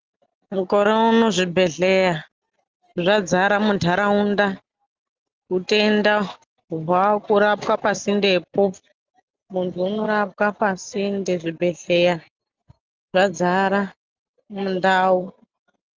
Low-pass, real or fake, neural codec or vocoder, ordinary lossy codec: 7.2 kHz; real; none; Opus, 24 kbps